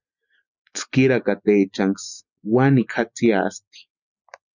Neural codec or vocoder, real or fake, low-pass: none; real; 7.2 kHz